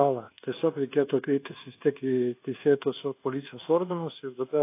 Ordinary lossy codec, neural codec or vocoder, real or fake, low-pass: AAC, 24 kbps; codec, 24 kHz, 1.2 kbps, DualCodec; fake; 3.6 kHz